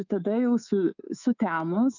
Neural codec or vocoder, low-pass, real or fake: codec, 24 kHz, 3.1 kbps, DualCodec; 7.2 kHz; fake